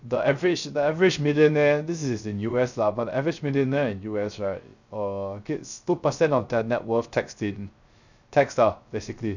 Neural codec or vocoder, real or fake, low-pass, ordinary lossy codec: codec, 16 kHz, 0.3 kbps, FocalCodec; fake; 7.2 kHz; none